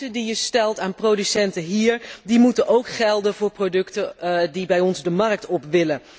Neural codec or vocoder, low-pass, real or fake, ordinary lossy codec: none; none; real; none